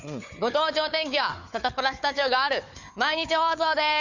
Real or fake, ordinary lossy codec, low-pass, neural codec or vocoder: fake; Opus, 64 kbps; 7.2 kHz; codec, 16 kHz, 4 kbps, FunCodec, trained on Chinese and English, 50 frames a second